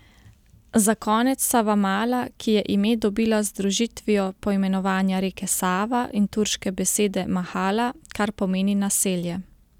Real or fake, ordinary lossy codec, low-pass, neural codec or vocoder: real; none; 19.8 kHz; none